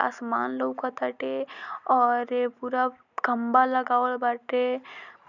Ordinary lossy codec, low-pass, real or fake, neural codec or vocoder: none; 7.2 kHz; real; none